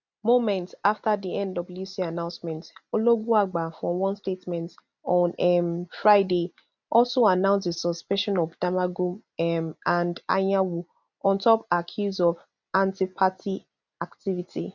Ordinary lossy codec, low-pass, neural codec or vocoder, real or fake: none; 7.2 kHz; none; real